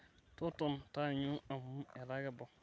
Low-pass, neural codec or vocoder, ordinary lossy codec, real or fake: none; none; none; real